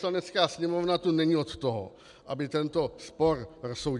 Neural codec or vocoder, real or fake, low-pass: none; real; 10.8 kHz